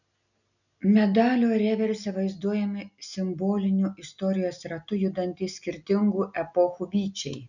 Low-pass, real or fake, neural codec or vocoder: 7.2 kHz; real; none